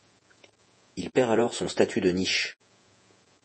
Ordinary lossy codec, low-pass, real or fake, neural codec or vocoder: MP3, 32 kbps; 10.8 kHz; fake; vocoder, 48 kHz, 128 mel bands, Vocos